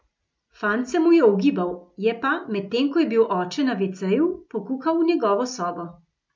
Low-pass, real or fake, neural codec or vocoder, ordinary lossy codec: none; real; none; none